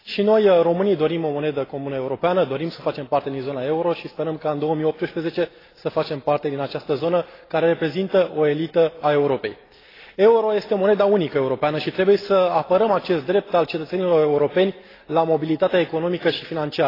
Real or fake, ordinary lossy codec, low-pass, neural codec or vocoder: real; AAC, 24 kbps; 5.4 kHz; none